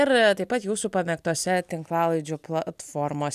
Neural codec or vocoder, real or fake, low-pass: none; real; 14.4 kHz